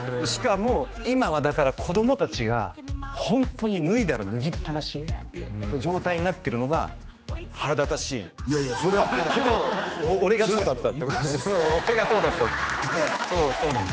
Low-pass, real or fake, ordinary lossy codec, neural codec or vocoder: none; fake; none; codec, 16 kHz, 2 kbps, X-Codec, HuBERT features, trained on general audio